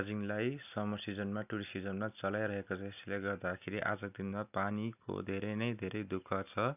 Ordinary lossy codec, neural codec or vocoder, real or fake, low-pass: none; none; real; 3.6 kHz